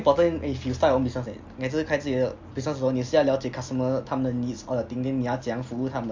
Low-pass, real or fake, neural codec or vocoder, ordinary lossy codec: 7.2 kHz; real; none; MP3, 64 kbps